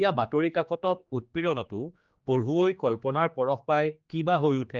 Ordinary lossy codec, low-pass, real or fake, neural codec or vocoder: Opus, 24 kbps; 7.2 kHz; fake; codec, 16 kHz, 2 kbps, X-Codec, HuBERT features, trained on general audio